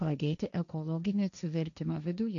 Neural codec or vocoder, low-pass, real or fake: codec, 16 kHz, 1.1 kbps, Voila-Tokenizer; 7.2 kHz; fake